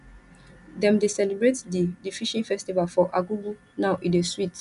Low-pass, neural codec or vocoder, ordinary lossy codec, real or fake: 10.8 kHz; none; none; real